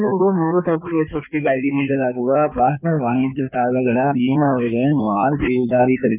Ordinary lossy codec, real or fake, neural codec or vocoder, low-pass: none; fake; codec, 16 kHz in and 24 kHz out, 1.1 kbps, FireRedTTS-2 codec; 3.6 kHz